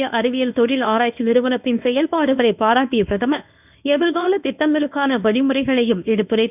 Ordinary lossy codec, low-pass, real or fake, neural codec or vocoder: none; 3.6 kHz; fake; codec, 24 kHz, 0.9 kbps, WavTokenizer, medium speech release version 1